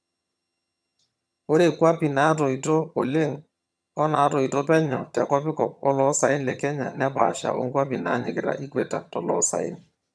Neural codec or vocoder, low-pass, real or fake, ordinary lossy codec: vocoder, 22.05 kHz, 80 mel bands, HiFi-GAN; none; fake; none